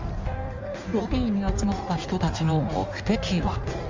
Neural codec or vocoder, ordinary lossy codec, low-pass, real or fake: codec, 16 kHz in and 24 kHz out, 1.1 kbps, FireRedTTS-2 codec; Opus, 32 kbps; 7.2 kHz; fake